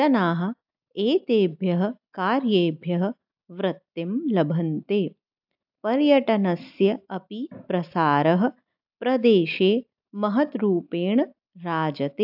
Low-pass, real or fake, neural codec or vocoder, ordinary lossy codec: 5.4 kHz; real; none; AAC, 48 kbps